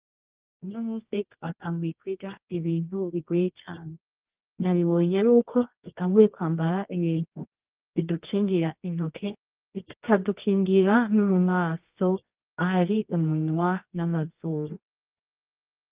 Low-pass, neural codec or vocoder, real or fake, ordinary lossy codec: 3.6 kHz; codec, 24 kHz, 0.9 kbps, WavTokenizer, medium music audio release; fake; Opus, 32 kbps